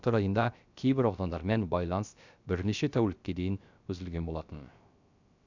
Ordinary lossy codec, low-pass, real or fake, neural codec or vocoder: none; 7.2 kHz; fake; codec, 16 kHz, 0.3 kbps, FocalCodec